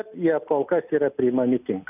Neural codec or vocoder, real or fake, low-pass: none; real; 3.6 kHz